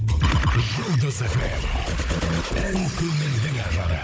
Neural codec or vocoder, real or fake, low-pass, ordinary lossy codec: codec, 16 kHz, 16 kbps, FunCodec, trained on Chinese and English, 50 frames a second; fake; none; none